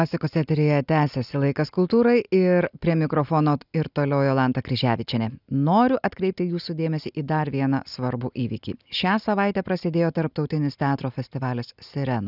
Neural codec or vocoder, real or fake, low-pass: none; real; 5.4 kHz